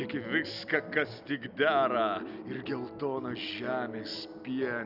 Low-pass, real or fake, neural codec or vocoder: 5.4 kHz; real; none